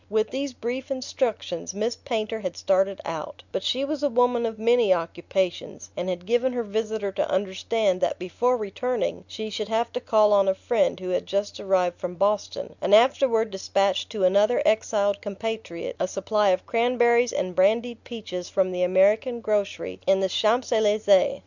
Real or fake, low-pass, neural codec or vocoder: real; 7.2 kHz; none